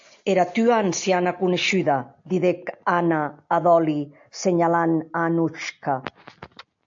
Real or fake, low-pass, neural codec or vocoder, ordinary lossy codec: real; 7.2 kHz; none; AAC, 64 kbps